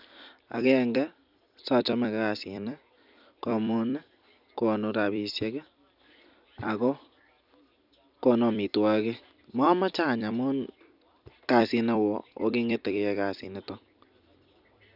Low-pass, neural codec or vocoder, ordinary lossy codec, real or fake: 5.4 kHz; vocoder, 44.1 kHz, 128 mel bands every 256 samples, BigVGAN v2; none; fake